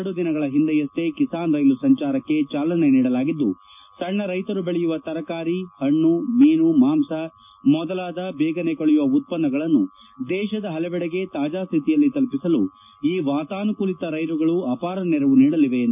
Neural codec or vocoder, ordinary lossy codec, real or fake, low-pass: none; none; real; 3.6 kHz